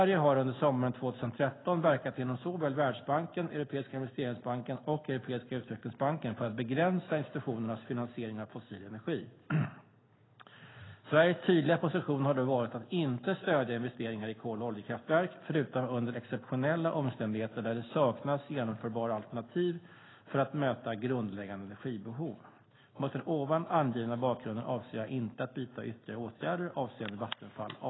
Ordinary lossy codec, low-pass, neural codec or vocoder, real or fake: AAC, 16 kbps; 7.2 kHz; none; real